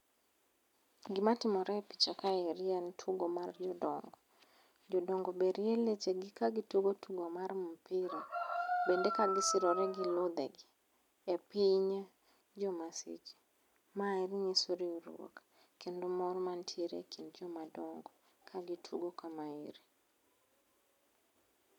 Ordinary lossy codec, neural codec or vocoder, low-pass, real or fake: none; none; 19.8 kHz; real